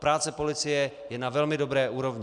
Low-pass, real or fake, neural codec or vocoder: 10.8 kHz; real; none